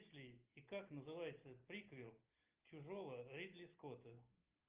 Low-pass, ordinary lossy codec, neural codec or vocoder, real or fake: 3.6 kHz; Opus, 24 kbps; none; real